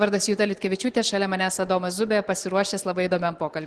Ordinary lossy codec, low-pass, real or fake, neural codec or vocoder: Opus, 16 kbps; 10.8 kHz; real; none